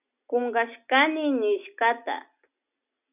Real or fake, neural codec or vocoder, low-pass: real; none; 3.6 kHz